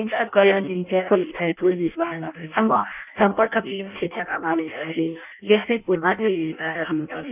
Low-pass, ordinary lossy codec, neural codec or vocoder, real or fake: 3.6 kHz; none; codec, 16 kHz in and 24 kHz out, 0.6 kbps, FireRedTTS-2 codec; fake